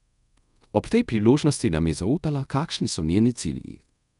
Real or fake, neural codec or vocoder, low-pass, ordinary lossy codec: fake; codec, 24 kHz, 0.5 kbps, DualCodec; 10.8 kHz; none